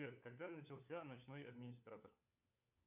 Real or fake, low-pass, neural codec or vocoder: fake; 3.6 kHz; codec, 16 kHz, 4 kbps, FunCodec, trained on LibriTTS, 50 frames a second